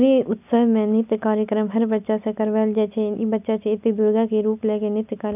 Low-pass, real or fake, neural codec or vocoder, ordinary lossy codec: 3.6 kHz; real; none; none